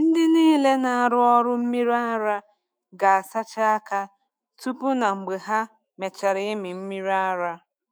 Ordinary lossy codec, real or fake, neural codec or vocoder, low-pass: none; fake; autoencoder, 48 kHz, 128 numbers a frame, DAC-VAE, trained on Japanese speech; none